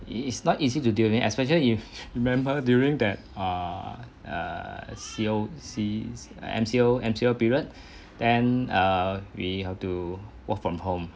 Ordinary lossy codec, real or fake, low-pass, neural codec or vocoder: none; real; none; none